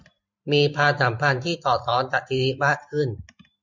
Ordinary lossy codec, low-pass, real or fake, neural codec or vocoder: MP3, 64 kbps; 7.2 kHz; fake; vocoder, 44.1 kHz, 128 mel bands every 512 samples, BigVGAN v2